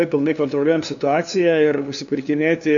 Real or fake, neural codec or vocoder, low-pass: fake; codec, 16 kHz, 2 kbps, FunCodec, trained on LibriTTS, 25 frames a second; 7.2 kHz